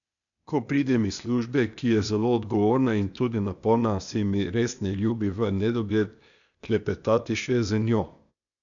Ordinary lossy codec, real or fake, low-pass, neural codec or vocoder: none; fake; 7.2 kHz; codec, 16 kHz, 0.8 kbps, ZipCodec